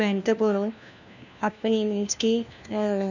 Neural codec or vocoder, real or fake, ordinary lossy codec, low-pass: codec, 16 kHz, 1 kbps, FunCodec, trained on LibriTTS, 50 frames a second; fake; none; 7.2 kHz